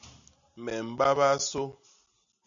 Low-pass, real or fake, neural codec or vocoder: 7.2 kHz; real; none